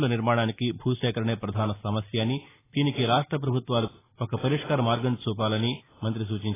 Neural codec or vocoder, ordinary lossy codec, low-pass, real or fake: none; AAC, 16 kbps; 3.6 kHz; real